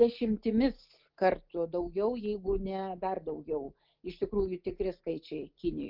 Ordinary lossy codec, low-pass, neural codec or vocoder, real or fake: Opus, 24 kbps; 5.4 kHz; none; real